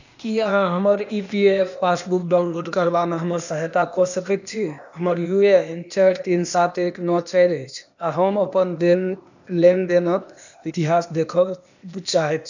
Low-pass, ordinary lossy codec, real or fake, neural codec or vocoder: 7.2 kHz; none; fake; codec, 16 kHz, 0.8 kbps, ZipCodec